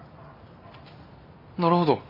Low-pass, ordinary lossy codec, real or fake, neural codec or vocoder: 5.4 kHz; MP3, 32 kbps; real; none